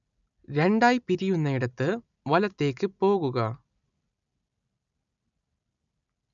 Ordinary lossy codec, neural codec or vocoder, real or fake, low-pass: none; none; real; 7.2 kHz